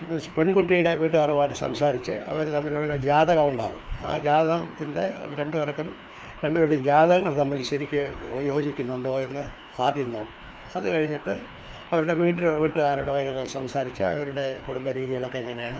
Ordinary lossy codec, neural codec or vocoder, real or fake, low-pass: none; codec, 16 kHz, 2 kbps, FreqCodec, larger model; fake; none